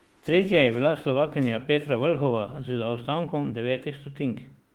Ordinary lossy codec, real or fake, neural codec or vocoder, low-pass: Opus, 24 kbps; fake; autoencoder, 48 kHz, 32 numbers a frame, DAC-VAE, trained on Japanese speech; 19.8 kHz